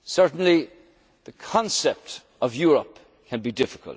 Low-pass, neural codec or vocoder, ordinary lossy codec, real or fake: none; none; none; real